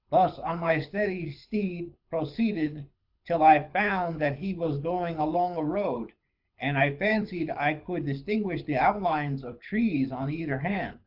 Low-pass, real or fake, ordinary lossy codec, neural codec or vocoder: 5.4 kHz; fake; AAC, 48 kbps; codec, 24 kHz, 6 kbps, HILCodec